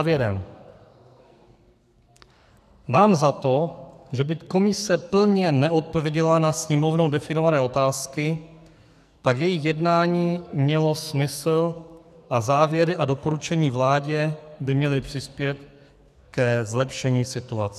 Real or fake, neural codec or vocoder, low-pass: fake; codec, 44.1 kHz, 2.6 kbps, SNAC; 14.4 kHz